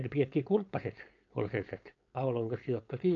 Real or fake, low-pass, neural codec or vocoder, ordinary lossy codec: fake; 7.2 kHz; codec, 16 kHz, 4.8 kbps, FACodec; AAC, 48 kbps